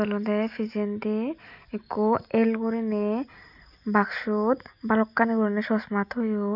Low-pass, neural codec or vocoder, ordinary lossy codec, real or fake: 5.4 kHz; none; none; real